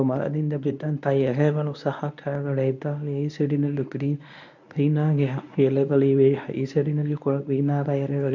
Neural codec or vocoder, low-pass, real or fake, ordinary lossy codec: codec, 24 kHz, 0.9 kbps, WavTokenizer, medium speech release version 1; 7.2 kHz; fake; none